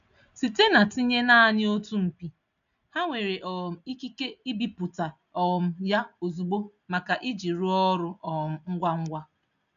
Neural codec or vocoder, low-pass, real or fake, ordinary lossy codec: none; 7.2 kHz; real; none